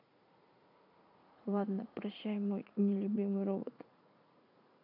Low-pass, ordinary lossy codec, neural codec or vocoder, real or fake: 5.4 kHz; none; vocoder, 44.1 kHz, 80 mel bands, Vocos; fake